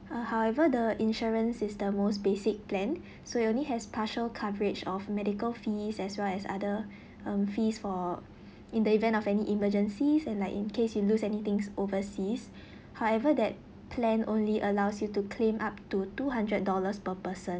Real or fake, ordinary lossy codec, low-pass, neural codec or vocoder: real; none; none; none